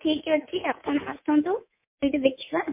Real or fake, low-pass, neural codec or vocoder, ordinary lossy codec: fake; 3.6 kHz; codec, 24 kHz, 3.1 kbps, DualCodec; MP3, 32 kbps